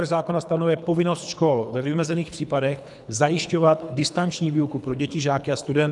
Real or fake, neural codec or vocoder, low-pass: fake; codec, 24 kHz, 3 kbps, HILCodec; 10.8 kHz